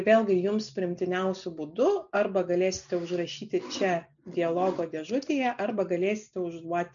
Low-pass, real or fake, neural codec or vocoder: 7.2 kHz; real; none